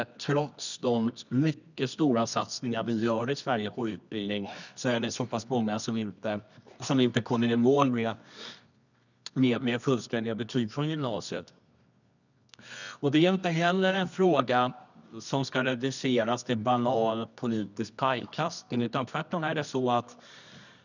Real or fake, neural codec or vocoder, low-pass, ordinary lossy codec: fake; codec, 24 kHz, 0.9 kbps, WavTokenizer, medium music audio release; 7.2 kHz; none